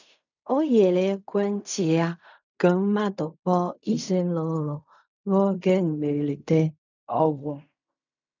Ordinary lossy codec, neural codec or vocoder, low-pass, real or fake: none; codec, 16 kHz in and 24 kHz out, 0.4 kbps, LongCat-Audio-Codec, fine tuned four codebook decoder; 7.2 kHz; fake